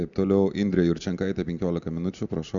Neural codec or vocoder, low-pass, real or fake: none; 7.2 kHz; real